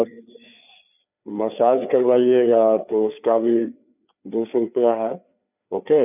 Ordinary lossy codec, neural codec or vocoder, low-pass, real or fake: none; codec, 16 kHz, 4 kbps, FreqCodec, larger model; 3.6 kHz; fake